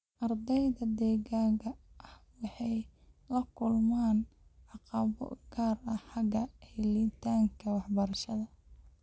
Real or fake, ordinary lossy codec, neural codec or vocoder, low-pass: real; none; none; none